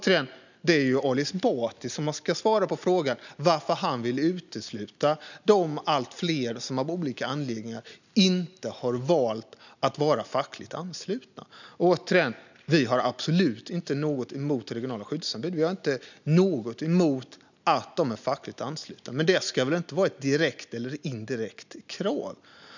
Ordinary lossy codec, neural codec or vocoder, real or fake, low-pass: none; none; real; 7.2 kHz